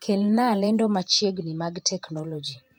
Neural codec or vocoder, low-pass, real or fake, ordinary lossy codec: vocoder, 48 kHz, 128 mel bands, Vocos; 19.8 kHz; fake; none